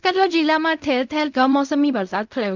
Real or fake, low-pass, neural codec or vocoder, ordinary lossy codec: fake; 7.2 kHz; codec, 16 kHz in and 24 kHz out, 0.4 kbps, LongCat-Audio-Codec, fine tuned four codebook decoder; none